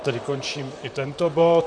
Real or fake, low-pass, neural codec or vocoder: fake; 9.9 kHz; vocoder, 44.1 kHz, 128 mel bands, Pupu-Vocoder